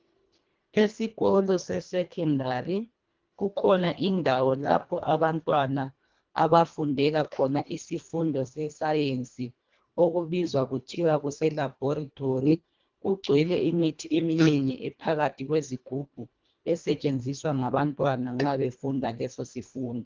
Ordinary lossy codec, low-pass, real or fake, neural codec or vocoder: Opus, 32 kbps; 7.2 kHz; fake; codec, 24 kHz, 1.5 kbps, HILCodec